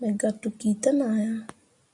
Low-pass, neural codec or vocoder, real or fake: 10.8 kHz; none; real